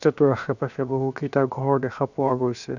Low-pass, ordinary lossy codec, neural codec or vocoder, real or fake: 7.2 kHz; none; codec, 16 kHz, 0.7 kbps, FocalCodec; fake